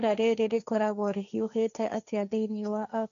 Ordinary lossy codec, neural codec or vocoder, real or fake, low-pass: none; codec, 16 kHz, 1.1 kbps, Voila-Tokenizer; fake; 7.2 kHz